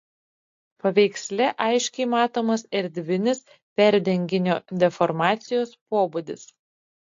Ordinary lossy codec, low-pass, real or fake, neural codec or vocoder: AAC, 48 kbps; 7.2 kHz; real; none